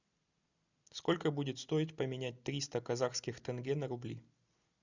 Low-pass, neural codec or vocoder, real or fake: 7.2 kHz; none; real